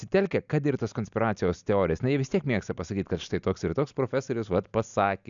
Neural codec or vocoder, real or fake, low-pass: none; real; 7.2 kHz